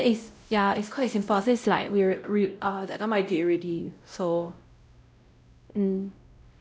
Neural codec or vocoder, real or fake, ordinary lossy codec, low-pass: codec, 16 kHz, 0.5 kbps, X-Codec, WavLM features, trained on Multilingual LibriSpeech; fake; none; none